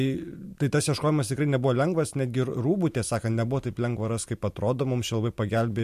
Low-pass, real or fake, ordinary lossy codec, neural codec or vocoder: 14.4 kHz; real; MP3, 64 kbps; none